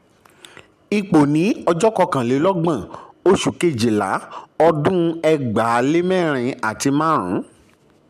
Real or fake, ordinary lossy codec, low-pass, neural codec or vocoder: real; MP3, 96 kbps; 14.4 kHz; none